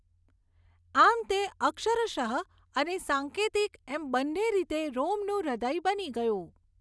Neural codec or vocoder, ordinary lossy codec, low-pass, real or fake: none; none; none; real